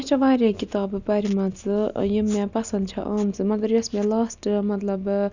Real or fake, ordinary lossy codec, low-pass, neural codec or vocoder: real; none; 7.2 kHz; none